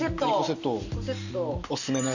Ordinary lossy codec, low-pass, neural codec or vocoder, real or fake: none; 7.2 kHz; none; real